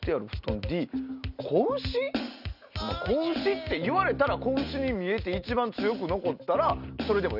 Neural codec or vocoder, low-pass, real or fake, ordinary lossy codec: none; 5.4 kHz; real; none